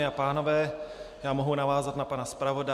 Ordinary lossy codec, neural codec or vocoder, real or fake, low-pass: MP3, 96 kbps; none; real; 14.4 kHz